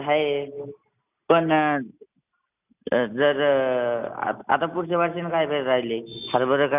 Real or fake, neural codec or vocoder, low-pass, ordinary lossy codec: real; none; 3.6 kHz; none